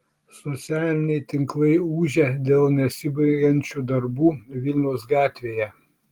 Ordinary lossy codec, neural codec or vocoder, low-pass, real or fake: Opus, 24 kbps; none; 19.8 kHz; real